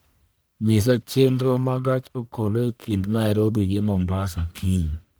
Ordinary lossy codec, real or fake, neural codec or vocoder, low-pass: none; fake; codec, 44.1 kHz, 1.7 kbps, Pupu-Codec; none